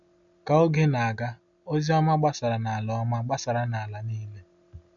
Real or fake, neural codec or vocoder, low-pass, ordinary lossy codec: real; none; 7.2 kHz; none